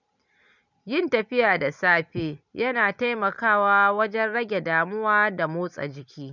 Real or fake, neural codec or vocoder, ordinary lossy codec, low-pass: real; none; none; 7.2 kHz